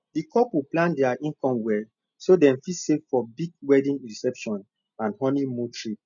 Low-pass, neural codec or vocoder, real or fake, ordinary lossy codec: 7.2 kHz; none; real; none